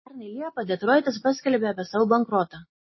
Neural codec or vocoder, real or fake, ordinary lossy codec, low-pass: none; real; MP3, 24 kbps; 7.2 kHz